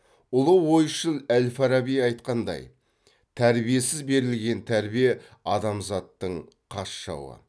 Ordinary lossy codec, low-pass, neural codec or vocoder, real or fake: none; none; none; real